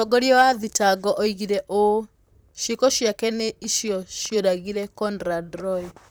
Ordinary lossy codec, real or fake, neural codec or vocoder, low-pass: none; fake; vocoder, 44.1 kHz, 128 mel bands, Pupu-Vocoder; none